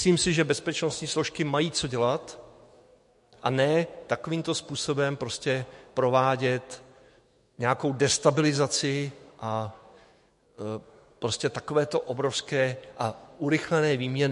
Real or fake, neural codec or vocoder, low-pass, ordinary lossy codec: fake; autoencoder, 48 kHz, 128 numbers a frame, DAC-VAE, trained on Japanese speech; 14.4 kHz; MP3, 48 kbps